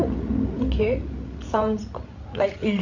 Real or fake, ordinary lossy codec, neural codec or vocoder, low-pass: fake; none; codec, 16 kHz, 16 kbps, FreqCodec, larger model; 7.2 kHz